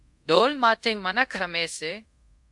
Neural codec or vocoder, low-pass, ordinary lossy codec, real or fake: codec, 24 kHz, 0.5 kbps, DualCodec; 10.8 kHz; MP3, 64 kbps; fake